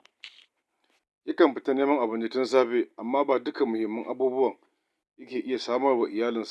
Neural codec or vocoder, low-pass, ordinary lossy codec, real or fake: none; none; none; real